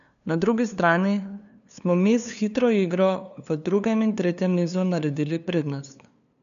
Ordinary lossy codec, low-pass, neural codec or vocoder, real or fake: none; 7.2 kHz; codec, 16 kHz, 2 kbps, FunCodec, trained on LibriTTS, 25 frames a second; fake